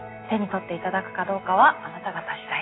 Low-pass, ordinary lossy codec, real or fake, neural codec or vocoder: 7.2 kHz; AAC, 16 kbps; real; none